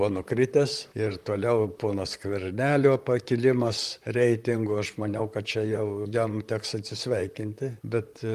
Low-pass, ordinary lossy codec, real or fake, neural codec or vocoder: 14.4 kHz; Opus, 32 kbps; fake; vocoder, 44.1 kHz, 128 mel bands, Pupu-Vocoder